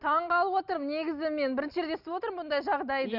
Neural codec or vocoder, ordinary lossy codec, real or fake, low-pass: none; Opus, 64 kbps; real; 5.4 kHz